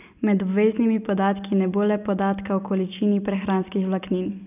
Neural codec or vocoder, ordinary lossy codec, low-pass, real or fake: none; none; 3.6 kHz; real